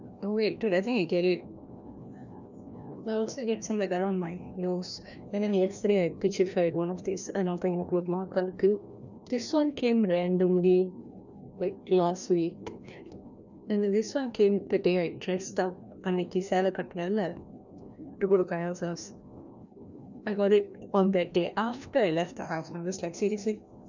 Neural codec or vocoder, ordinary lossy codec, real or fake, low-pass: codec, 16 kHz, 1 kbps, FreqCodec, larger model; none; fake; 7.2 kHz